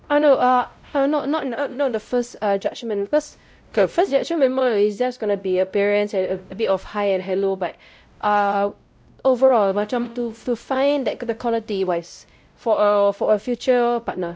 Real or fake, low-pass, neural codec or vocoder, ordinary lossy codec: fake; none; codec, 16 kHz, 0.5 kbps, X-Codec, WavLM features, trained on Multilingual LibriSpeech; none